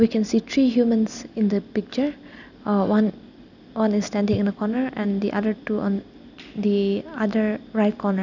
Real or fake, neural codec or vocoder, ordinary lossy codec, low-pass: real; none; none; 7.2 kHz